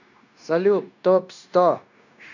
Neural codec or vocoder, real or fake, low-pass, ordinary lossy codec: codec, 16 kHz, 0.9 kbps, LongCat-Audio-Codec; fake; 7.2 kHz; none